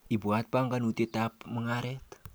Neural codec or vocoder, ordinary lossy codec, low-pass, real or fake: none; none; none; real